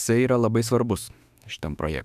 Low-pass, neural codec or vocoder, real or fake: 14.4 kHz; autoencoder, 48 kHz, 32 numbers a frame, DAC-VAE, trained on Japanese speech; fake